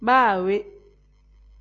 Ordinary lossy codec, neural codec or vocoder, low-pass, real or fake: AAC, 48 kbps; none; 7.2 kHz; real